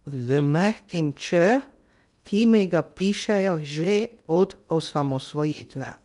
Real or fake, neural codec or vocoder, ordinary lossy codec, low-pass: fake; codec, 16 kHz in and 24 kHz out, 0.6 kbps, FocalCodec, streaming, 2048 codes; none; 10.8 kHz